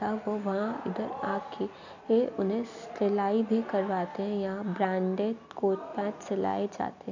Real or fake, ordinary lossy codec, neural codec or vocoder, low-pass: real; none; none; 7.2 kHz